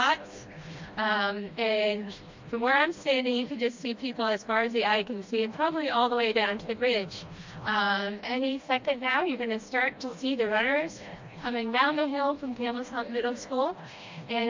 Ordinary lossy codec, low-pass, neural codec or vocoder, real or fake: MP3, 48 kbps; 7.2 kHz; codec, 16 kHz, 1 kbps, FreqCodec, smaller model; fake